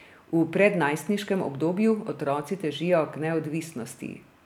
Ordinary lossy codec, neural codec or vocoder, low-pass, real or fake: none; none; 19.8 kHz; real